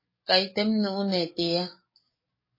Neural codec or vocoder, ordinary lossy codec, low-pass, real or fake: codec, 44.1 kHz, 7.8 kbps, DAC; MP3, 24 kbps; 5.4 kHz; fake